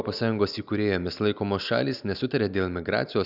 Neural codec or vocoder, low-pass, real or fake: none; 5.4 kHz; real